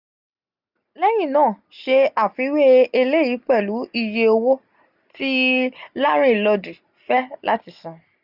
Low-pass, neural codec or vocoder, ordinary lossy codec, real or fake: 5.4 kHz; none; none; real